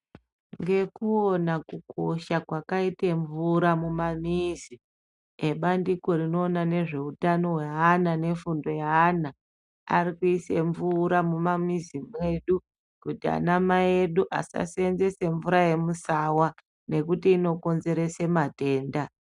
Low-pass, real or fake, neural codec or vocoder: 10.8 kHz; real; none